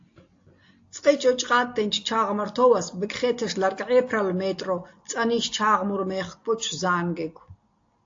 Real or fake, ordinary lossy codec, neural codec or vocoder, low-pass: real; AAC, 48 kbps; none; 7.2 kHz